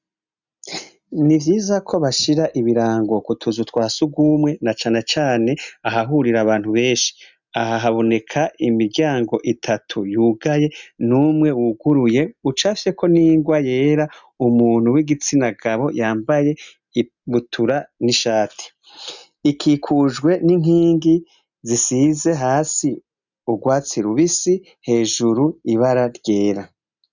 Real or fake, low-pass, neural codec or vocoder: real; 7.2 kHz; none